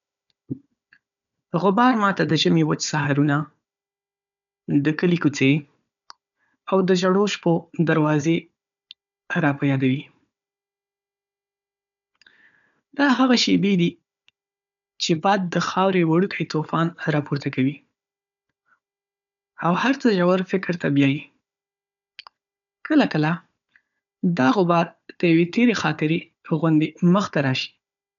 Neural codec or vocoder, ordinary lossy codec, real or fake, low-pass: codec, 16 kHz, 4 kbps, FunCodec, trained on Chinese and English, 50 frames a second; none; fake; 7.2 kHz